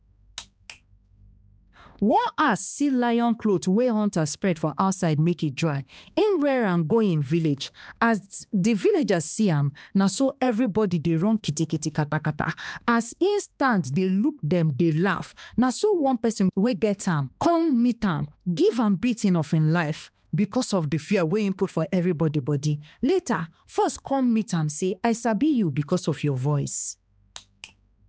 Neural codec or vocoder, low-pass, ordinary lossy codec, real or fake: codec, 16 kHz, 2 kbps, X-Codec, HuBERT features, trained on balanced general audio; none; none; fake